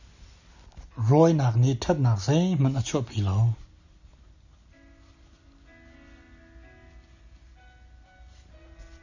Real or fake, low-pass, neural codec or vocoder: real; 7.2 kHz; none